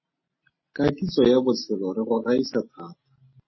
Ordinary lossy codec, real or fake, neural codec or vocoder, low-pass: MP3, 24 kbps; real; none; 7.2 kHz